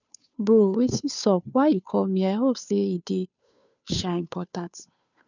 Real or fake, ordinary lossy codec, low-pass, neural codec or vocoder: fake; none; 7.2 kHz; codec, 16 kHz, 2 kbps, FunCodec, trained on Chinese and English, 25 frames a second